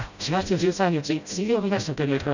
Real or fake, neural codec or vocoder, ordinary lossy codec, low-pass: fake; codec, 16 kHz, 0.5 kbps, FreqCodec, smaller model; none; 7.2 kHz